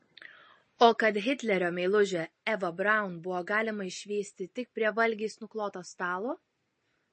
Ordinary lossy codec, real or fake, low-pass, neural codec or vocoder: MP3, 32 kbps; real; 9.9 kHz; none